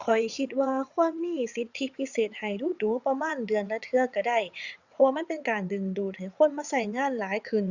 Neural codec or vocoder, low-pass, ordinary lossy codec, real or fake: vocoder, 22.05 kHz, 80 mel bands, WaveNeXt; 7.2 kHz; Opus, 64 kbps; fake